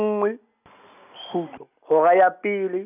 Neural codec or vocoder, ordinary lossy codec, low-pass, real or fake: none; none; 3.6 kHz; real